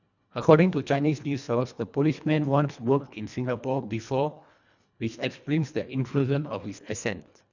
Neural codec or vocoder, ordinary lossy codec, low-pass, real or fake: codec, 24 kHz, 1.5 kbps, HILCodec; none; 7.2 kHz; fake